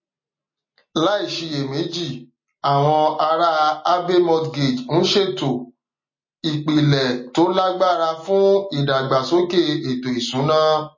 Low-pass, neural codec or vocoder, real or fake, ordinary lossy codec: 7.2 kHz; none; real; MP3, 32 kbps